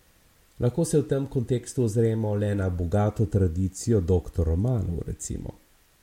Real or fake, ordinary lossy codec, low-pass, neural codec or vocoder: real; MP3, 64 kbps; 19.8 kHz; none